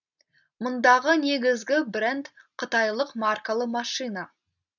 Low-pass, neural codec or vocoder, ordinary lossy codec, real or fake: 7.2 kHz; none; none; real